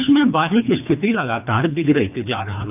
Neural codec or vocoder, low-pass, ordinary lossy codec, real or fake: codec, 24 kHz, 3 kbps, HILCodec; 3.6 kHz; none; fake